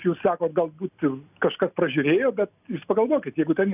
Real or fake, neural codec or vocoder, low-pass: real; none; 3.6 kHz